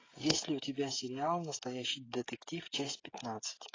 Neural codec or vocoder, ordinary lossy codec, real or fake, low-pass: codec, 16 kHz, 16 kbps, FreqCodec, smaller model; AAC, 32 kbps; fake; 7.2 kHz